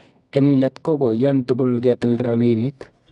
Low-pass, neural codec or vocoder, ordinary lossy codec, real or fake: 10.8 kHz; codec, 24 kHz, 0.9 kbps, WavTokenizer, medium music audio release; none; fake